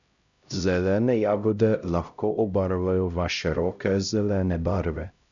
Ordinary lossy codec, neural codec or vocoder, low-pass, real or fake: MP3, 96 kbps; codec, 16 kHz, 0.5 kbps, X-Codec, HuBERT features, trained on LibriSpeech; 7.2 kHz; fake